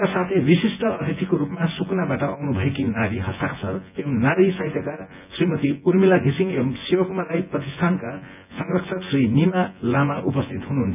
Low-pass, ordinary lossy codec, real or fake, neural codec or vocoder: 3.6 kHz; none; fake; vocoder, 24 kHz, 100 mel bands, Vocos